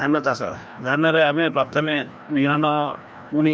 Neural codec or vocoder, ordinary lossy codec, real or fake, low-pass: codec, 16 kHz, 1 kbps, FreqCodec, larger model; none; fake; none